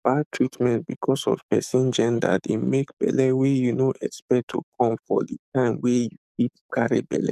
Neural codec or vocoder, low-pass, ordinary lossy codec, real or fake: codec, 44.1 kHz, 7.8 kbps, DAC; 14.4 kHz; none; fake